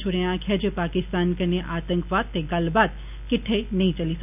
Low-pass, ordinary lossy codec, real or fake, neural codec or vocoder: 3.6 kHz; none; real; none